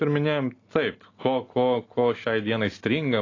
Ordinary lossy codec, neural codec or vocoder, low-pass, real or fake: AAC, 32 kbps; none; 7.2 kHz; real